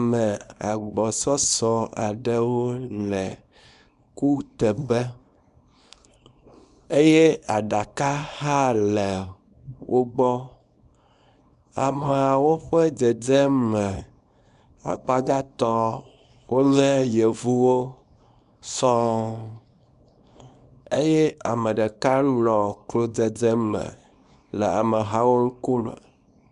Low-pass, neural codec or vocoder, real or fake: 10.8 kHz; codec, 24 kHz, 0.9 kbps, WavTokenizer, small release; fake